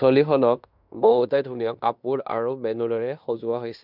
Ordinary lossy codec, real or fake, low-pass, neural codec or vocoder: none; fake; 5.4 kHz; codec, 16 kHz in and 24 kHz out, 0.9 kbps, LongCat-Audio-Codec, fine tuned four codebook decoder